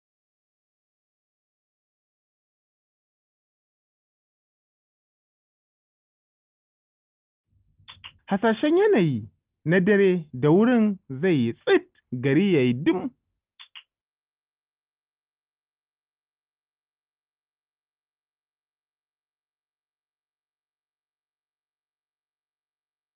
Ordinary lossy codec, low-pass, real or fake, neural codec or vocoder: Opus, 32 kbps; 3.6 kHz; real; none